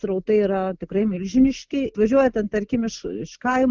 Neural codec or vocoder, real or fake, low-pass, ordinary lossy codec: none; real; 7.2 kHz; Opus, 32 kbps